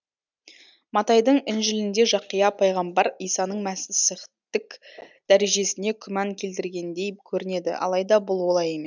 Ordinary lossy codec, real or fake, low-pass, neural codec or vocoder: none; real; 7.2 kHz; none